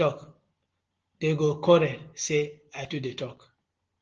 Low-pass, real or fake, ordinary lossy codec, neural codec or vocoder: 7.2 kHz; real; Opus, 16 kbps; none